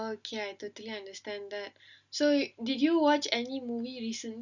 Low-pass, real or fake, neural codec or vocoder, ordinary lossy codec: 7.2 kHz; real; none; none